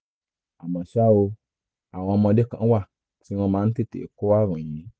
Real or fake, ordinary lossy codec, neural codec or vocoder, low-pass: real; none; none; none